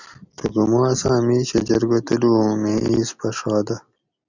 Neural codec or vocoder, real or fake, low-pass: none; real; 7.2 kHz